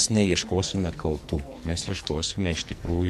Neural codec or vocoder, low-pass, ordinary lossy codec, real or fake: codec, 44.1 kHz, 2.6 kbps, SNAC; 14.4 kHz; MP3, 64 kbps; fake